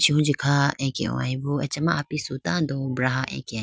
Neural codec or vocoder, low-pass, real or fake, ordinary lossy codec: none; none; real; none